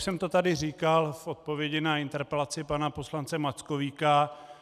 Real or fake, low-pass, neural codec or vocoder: real; 14.4 kHz; none